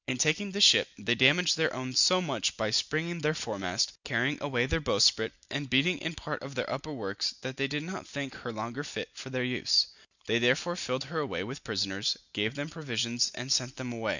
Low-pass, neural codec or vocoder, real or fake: 7.2 kHz; none; real